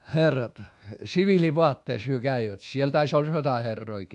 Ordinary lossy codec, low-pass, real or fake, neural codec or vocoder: AAC, 64 kbps; 10.8 kHz; fake; codec, 24 kHz, 1.2 kbps, DualCodec